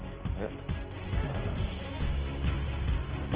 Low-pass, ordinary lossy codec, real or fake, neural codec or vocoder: 3.6 kHz; Opus, 16 kbps; real; none